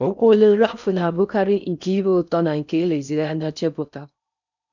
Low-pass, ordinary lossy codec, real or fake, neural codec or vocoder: 7.2 kHz; none; fake; codec, 16 kHz in and 24 kHz out, 0.6 kbps, FocalCodec, streaming, 4096 codes